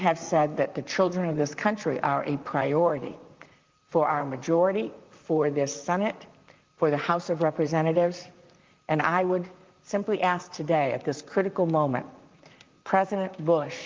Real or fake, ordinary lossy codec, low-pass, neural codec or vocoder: fake; Opus, 32 kbps; 7.2 kHz; vocoder, 44.1 kHz, 128 mel bands, Pupu-Vocoder